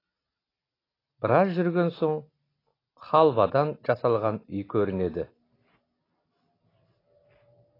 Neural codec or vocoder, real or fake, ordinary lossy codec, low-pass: none; real; AAC, 24 kbps; 5.4 kHz